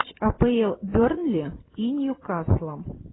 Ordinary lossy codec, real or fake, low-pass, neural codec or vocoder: AAC, 16 kbps; fake; 7.2 kHz; vocoder, 44.1 kHz, 128 mel bands every 256 samples, BigVGAN v2